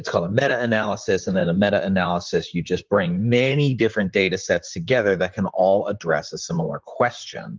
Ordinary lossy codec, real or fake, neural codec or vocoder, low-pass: Opus, 32 kbps; fake; vocoder, 44.1 kHz, 128 mel bands, Pupu-Vocoder; 7.2 kHz